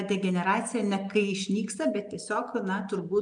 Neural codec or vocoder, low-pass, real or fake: none; 9.9 kHz; real